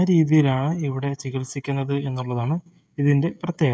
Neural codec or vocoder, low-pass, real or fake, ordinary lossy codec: codec, 16 kHz, 16 kbps, FreqCodec, smaller model; none; fake; none